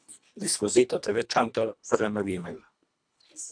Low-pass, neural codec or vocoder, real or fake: 9.9 kHz; codec, 24 kHz, 1.5 kbps, HILCodec; fake